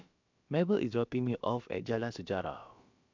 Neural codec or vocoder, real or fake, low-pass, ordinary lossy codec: codec, 16 kHz, about 1 kbps, DyCAST, with the encoder's durations; fake; 7.2 kHz; MP3, 64 kbps